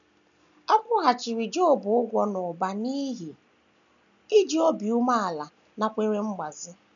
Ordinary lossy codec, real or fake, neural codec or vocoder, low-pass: none; real; none; 7.2 kHz